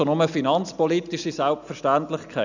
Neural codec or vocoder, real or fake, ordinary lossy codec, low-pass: none; real; none; 7.2 kHz